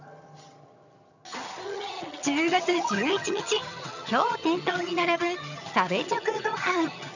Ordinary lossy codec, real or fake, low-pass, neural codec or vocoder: none; fake; 7.2 kHz; vocoder, 22.05 kHz, 80 mel bands, HiFi-GAN